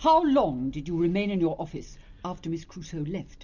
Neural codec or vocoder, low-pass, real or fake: none; 7.2 kHz; real